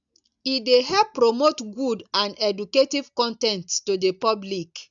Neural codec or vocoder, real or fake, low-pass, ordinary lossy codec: none; real; 7.2 kHz; none